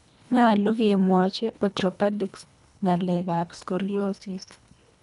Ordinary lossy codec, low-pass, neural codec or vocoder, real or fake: none; 10.8 kHz; codec, 24 kHz, 1.5 kbps, HILCodec; fake